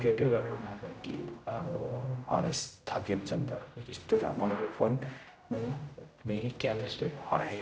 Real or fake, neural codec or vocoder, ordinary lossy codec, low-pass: fake; codec, 16 kHz, 0.5 kbps, X-Codec, HuBERT features, trained on general audio; none; none